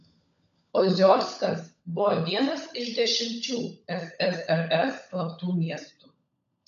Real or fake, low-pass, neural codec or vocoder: fake; 7.2 kHz; codec, 16 kHz, 16 kbps, FunCodec, trained on LibriTTS, 50 frames a second